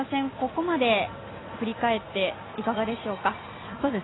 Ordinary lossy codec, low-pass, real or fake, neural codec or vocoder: AAC, 16 kbps; 7.2 kHz; real; none